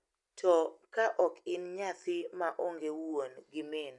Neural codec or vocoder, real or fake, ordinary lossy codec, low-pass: none; real; none; 10.8 kHz